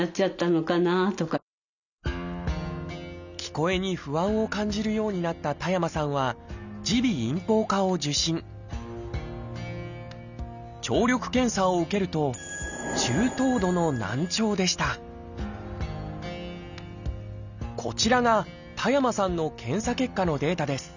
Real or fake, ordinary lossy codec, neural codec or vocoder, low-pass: real; none; none; 7.2 kHz